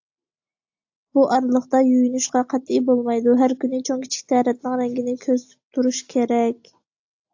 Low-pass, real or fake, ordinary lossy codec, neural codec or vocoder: 7.2 kHz; real; AAC, 48 kbps; none